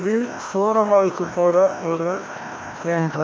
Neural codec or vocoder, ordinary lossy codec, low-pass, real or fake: codec, 16 kHz, 1 kbps, FreqCodec, larger model; none; none; fake